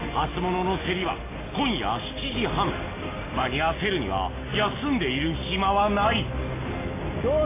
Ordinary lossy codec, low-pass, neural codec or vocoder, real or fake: AAC, 16 kbps; 3.6 kHz; none; real